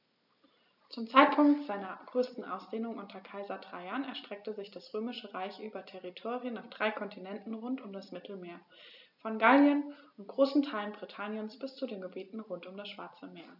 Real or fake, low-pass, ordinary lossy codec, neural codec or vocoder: real; 5.4 kHz; none; none